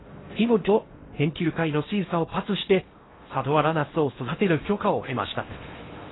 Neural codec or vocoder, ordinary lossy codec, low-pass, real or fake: codec, 16 kHz in and 24 kHz out, 0.6 kbps, FocalCodec, streaming, 4096 codes; AAC, 16 kbps; 7.2 kHz; fake